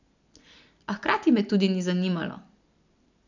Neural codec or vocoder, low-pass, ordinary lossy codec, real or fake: none; 7.2 kHz; none; real